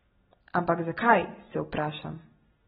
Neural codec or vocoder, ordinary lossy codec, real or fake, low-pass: none; AAC, 16 kbps; real; 19.8 kHz